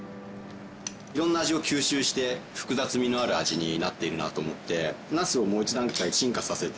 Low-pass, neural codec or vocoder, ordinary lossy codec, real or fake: none; none; none; real